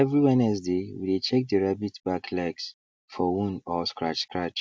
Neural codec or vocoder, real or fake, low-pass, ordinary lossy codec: none; real; none; none